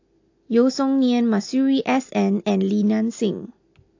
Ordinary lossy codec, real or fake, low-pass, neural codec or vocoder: AAC, 48 kbps; real; 7.2 kHz; none